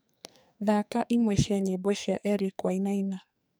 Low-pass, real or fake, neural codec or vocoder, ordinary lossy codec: none; fake; codec, 44.1 kHz, 2.6 kbps, SNAC; none